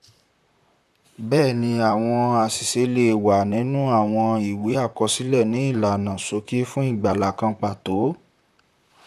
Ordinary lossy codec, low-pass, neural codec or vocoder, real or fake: none; 14.4 kHz; vocoder, 44.1 kHz, 128 mel bands, Pupu-Vocoder; fake